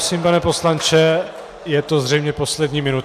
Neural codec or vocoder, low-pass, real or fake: none; 14.4 kHz; real